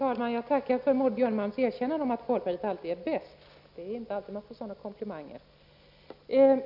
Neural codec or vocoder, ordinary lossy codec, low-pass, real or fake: none; none; 5.4 kHz; real